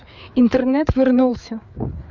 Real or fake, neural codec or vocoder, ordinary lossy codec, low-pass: fake; codec, 16 kHz, 4 kbps, FreqCodec, larger model; none; 7.2 kHz